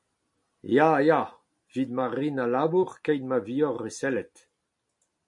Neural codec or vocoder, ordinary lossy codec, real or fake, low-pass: none; MP3, 48 kbps; real; 10.8 kHz